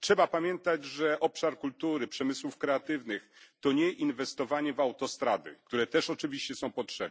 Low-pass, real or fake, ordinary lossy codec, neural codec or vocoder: none; real; none; none